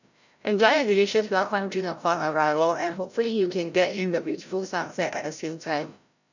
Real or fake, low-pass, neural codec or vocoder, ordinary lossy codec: fake; 7.2 kHz; codec, 16 kHz, 0.5 kbps, FreqCodec, larger model; none